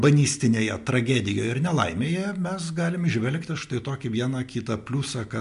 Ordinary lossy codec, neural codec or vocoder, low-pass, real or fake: MP3, 64 kbps; none; 10.8 kHz; real